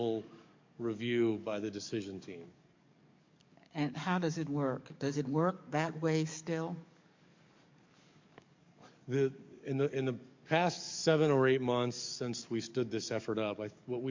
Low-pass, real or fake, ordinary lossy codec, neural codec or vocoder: 7.2 kHz; fake; MP3, 48 kbps; codec, 44.1 kHz, 7.8 kbps, DAC